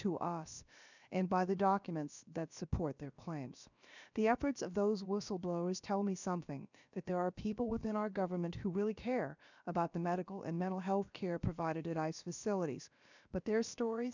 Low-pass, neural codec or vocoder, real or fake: 7.2 kHz; codec, 16 kHz, about 1 kbps, DyCAST, with the encoder's durations; fake